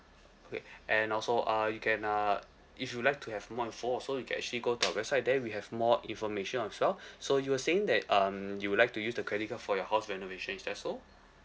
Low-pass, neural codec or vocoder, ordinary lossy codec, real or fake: none; none; none; real